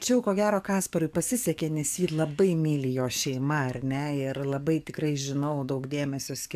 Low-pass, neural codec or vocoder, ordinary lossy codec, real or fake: 14.4 kHz; codec, 44.1 kHz, 7.8 kbps, DAC; AAC, 96 kbps; fake